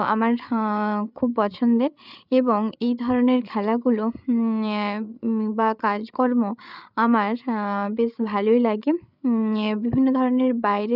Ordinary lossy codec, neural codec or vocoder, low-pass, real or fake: none; codec, 16 kHz, 8 kbps, FreqCodec, larger model; 5.4 kHz; fake